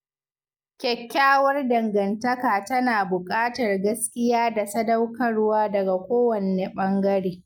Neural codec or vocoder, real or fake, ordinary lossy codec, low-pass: none; real; none; 19.8 kHz